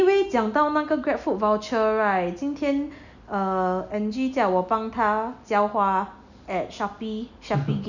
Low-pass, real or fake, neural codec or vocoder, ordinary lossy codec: 7.2 kHz; real; none; none